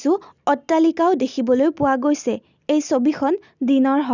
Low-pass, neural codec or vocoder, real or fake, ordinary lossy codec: 7.2 kHz; none; real; none